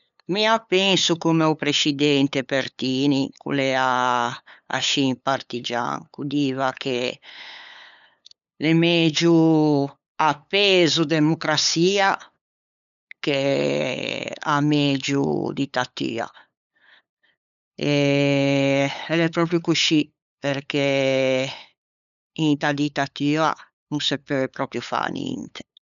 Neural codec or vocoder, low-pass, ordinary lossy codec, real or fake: codec, 16 kHz, 8 kbps, FunCodec, trained on LibriTTS, 25 frames a second; 7.2 kHz; none; fake